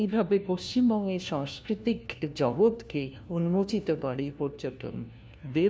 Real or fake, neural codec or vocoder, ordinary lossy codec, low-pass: fake; codec, 16 kHz, 1 kbps, FunCodec, trained on LibriTTS, 50 frames a second; none; none